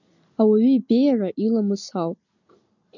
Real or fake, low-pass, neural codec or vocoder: real; 7.2 kHz; none